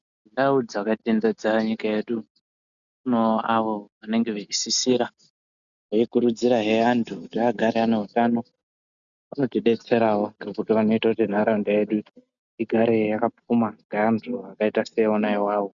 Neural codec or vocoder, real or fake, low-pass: none; real; 7.2 kHz